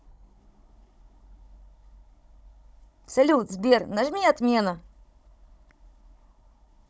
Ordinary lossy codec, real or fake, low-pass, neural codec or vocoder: none; fake; none; codec, 16 kHz, 16 kbps, FunCodec, trained on Chinese and English, 50 frames a second